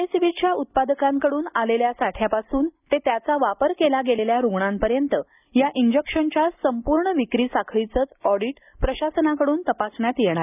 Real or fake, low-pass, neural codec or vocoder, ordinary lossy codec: real; 3.6 kHz; none; none